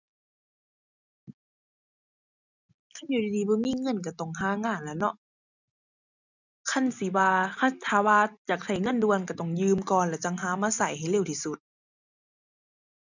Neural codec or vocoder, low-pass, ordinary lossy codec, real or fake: none; 7.2 kHz; none; real